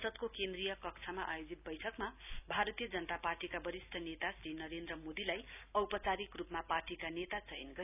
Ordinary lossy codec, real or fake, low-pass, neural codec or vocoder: none; real; 3.6 kHz; none